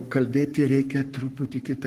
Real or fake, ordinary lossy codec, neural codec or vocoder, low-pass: fake; Opus, 24 kbps; codec, 44.1 kHz, 3.4 kbps, Pupu-Codec; 14.4 kHz